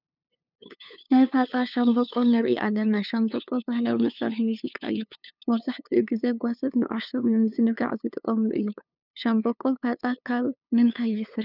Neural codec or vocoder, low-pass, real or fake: codec, 16 kHz, 2 kbps, FunCodec, trained on LibriTTS, 25 frames a second; 5.4 kHz; fake